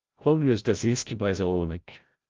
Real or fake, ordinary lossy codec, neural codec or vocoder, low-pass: fake; Opus, 32 kbps; codec, 16 kHz, 0.5 kbps, FreqCodec, larger model; 7.2 kHz